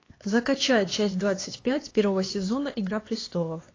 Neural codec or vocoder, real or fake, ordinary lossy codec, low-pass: codec, 16 kHz, 2 kbps, X-Codec, HuBERT features, trained on LibriSpeech; fake; AAC, 32 kbps; 7.2 kHz